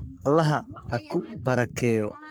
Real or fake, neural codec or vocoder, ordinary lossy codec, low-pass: fake; codec, 44.1 kHz, 3.4 kbps, Pupu-Codec; none; none